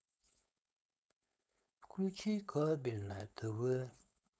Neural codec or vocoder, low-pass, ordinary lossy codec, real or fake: codec, 16 kHz, 4.8 kbps, FACodec; none; none; fake